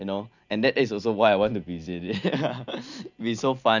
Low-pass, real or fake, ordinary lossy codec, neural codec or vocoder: 7.2 kHz; real; none; none